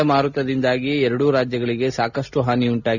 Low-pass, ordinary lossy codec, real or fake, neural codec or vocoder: 7.2 kHz; none; real; none